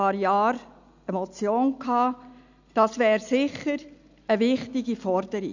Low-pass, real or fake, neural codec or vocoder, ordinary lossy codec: 7.2 kHz; real; none; none